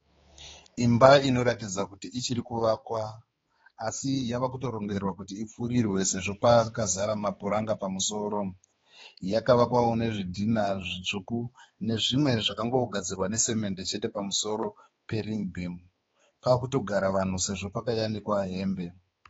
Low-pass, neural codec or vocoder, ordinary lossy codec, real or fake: 7.2 kHz; codec, 16 kHz, 4 kbps, X-Codec, HuBERT features, trained on balanced general audio; AAC, 24 kbps; fake